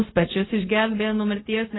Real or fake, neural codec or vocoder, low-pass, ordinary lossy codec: fake; codec, 16 kHz, 0.4 kbps, LongCat-Audio-Codec; 7.2 kHz; AAC, 16 kbps